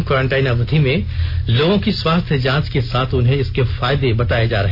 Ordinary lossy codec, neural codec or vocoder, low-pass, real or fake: none; none; 5.4 kHz; real